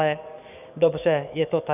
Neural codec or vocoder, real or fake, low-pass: codec, 44.1 kHz, 7.8 kbps, DAC; fake; 3.6 kHz